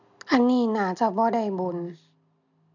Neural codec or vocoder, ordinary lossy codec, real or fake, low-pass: none; none; real; 7.2 kHz